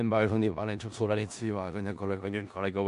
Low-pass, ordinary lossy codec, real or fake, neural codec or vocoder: 10.8 kHz; MP3, 64 kbps; fake; codec, 16 kHz in and 24 kHz out, 0.4 kbps, LongCat-Audio-Codec, four codebook decoder